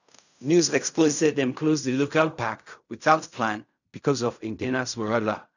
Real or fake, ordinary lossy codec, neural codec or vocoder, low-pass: fake; none; codec, 16 kHz in and 24 kHz out, 0.4 kbps, LongCat-Audio-Codec, fine tuned four codebook decoder; 7.2 kHz